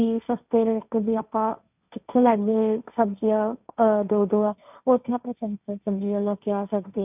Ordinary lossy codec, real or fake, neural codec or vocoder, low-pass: none; fake; codec, 16 kHz, 1.1 kbps, Voila-Tokenizer; 3.6 kHz